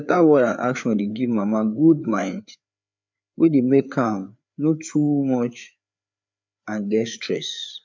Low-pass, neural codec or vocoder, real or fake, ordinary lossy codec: 7.2 kHz; codec, 16 kHz, 4 kbps, FreqCodec, larger model; fake; MP3, 64 kbps